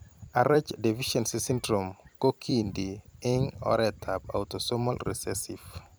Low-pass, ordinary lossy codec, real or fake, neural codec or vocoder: none; none; fake; vocoder, 44.1 kHz, 128 mel bands every 256 samples, BigVGAN v2